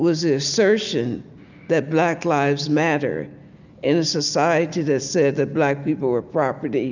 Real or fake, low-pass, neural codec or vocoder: real; 7.2 kHz; none